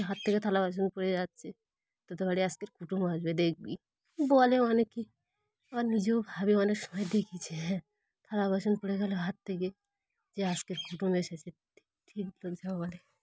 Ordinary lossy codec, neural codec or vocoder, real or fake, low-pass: none; none; real; none